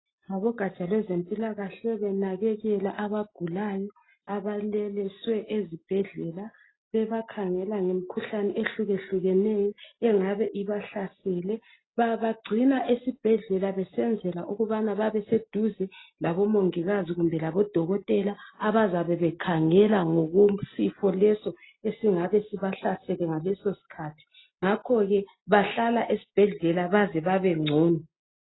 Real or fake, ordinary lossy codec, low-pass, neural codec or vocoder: real; AAC, 16 kbps; 7.2 kHz; none